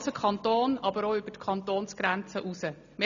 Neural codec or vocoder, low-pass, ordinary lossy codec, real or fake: none; 7.2 kHz; none; real